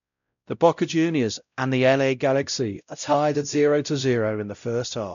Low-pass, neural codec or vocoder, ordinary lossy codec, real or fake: 7.2 kHz; codec, 16 kHz, 0.5 kbps, X-Codec, WavLM features, trained on Multilingual LibriSpeech; none; fake